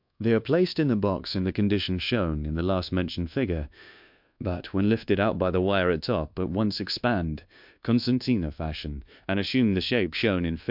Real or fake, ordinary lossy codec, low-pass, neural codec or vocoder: fake; MP3, 48 kbps; 5.4 kHz; codec, 24 kHz, 1.2 kbps, DualCodec